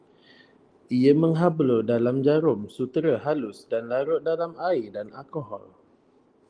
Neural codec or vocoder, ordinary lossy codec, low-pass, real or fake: none; Opus, 24 kbps; 9.9 kHz; real